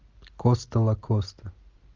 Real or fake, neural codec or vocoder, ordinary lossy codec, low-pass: real; none; Opus, 24 kbps; 7.2 kHz